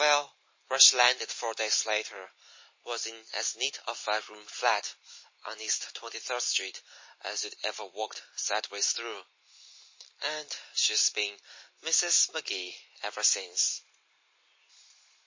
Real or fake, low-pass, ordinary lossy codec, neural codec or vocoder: real; 7.2 kHz; MP3, 32 kbps; none